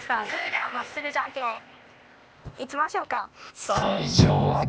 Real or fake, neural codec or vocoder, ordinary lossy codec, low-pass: fake; codec, 16 kHz, 0.8 kbps, ZipCodec; none; none